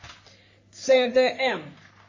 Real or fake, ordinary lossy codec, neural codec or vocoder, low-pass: fake; MP3, 32 kbps; codec, 44.1 kHz, 3.4 kbps, Pupu-Codec; 7.2 kHz